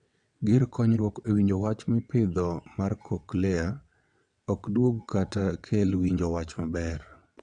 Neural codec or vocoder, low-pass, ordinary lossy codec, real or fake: vocoder, 22.05 kHz, 80 mel bands, WaveNeXt; 9.9 kHz; none; fake